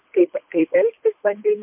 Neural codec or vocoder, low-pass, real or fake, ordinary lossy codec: codec, 24 kHz, 3 kbps, HILCodec; 3.6 kHz; fake; MP3, 32 kbps